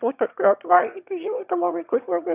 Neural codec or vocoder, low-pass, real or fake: autoencoder, 22.05 kHz, a latent of 192 numbers a frame, VITS, trained on one speaker; 3.6 kHz; fake